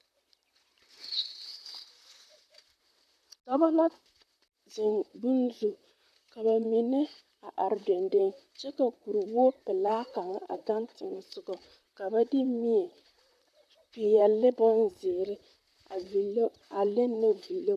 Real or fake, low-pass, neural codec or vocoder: fake; 14.4 kHz; vocoder, 44.1 kHz, 128 mel bands, Pupu-Vocoder